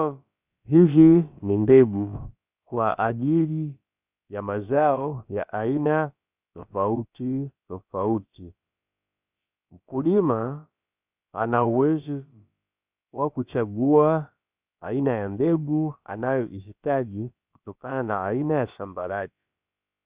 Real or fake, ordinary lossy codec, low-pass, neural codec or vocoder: fake; Opus, 64 kbps; 3.6 kHz; codec, 16 kHz, about 1 kbps, DyCAST, with the encoder's durations